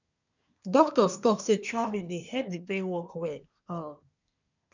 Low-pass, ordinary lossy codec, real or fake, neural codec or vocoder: 7.2 kHz; none; fake; codec, 24 kHz, 1 kbps, SNAC